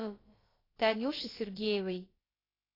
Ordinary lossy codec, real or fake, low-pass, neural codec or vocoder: AAC, 24 kbps; fake; 5.4 kHz; codec, 16 kHz, about 1 kbps, DyCAST, with the encoder's durations